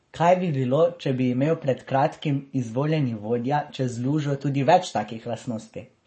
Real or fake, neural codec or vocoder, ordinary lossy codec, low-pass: fake; codec, 44.1 kHz, 7.8 kbps, Pupu-Codec; MP3, 32 kbps; 10.8 kHz